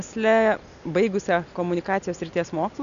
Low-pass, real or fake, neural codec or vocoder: 7.2 kHz; real; none